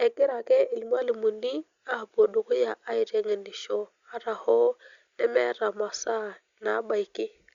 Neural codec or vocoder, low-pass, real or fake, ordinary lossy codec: none; 7.2 kHz; real; Opus, 64 kbps